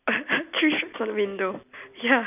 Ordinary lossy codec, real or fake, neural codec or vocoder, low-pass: none; real; none; 3.6 kHz